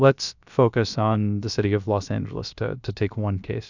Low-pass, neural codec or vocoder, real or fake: 7.2 kHz; codec, 16 kHz, about 1 kbps, DyCAST, with the encoder's durations; fake